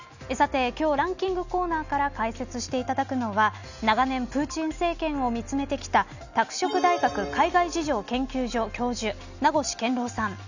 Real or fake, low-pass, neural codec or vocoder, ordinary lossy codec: real; 7.2 kHz; none; none